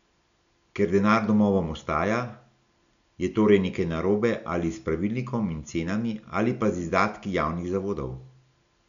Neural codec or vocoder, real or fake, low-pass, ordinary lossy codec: none; real; 7.2 kHz; none